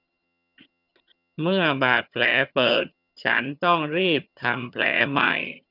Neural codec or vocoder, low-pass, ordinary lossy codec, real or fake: vocoder, 22.05 kHz, 80 mel bands, HiFi-GAN; 5.4 kHz; none; fake